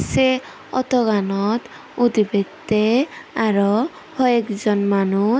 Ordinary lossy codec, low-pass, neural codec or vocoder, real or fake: none; none; none; real